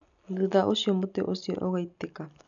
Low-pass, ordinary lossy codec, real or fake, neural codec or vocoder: 7.2 kHz; none; real; none